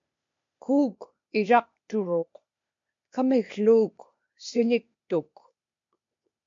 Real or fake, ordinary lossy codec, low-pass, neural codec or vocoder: fake; MP3, 48 kbps; 7.2 kHz; codec, 16 kHz, 0.8 kbps, ZipCodec